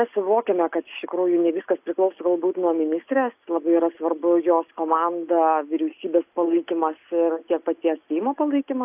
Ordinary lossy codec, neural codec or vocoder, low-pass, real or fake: AAC, 32 kbps; none; 3.6 kHz; real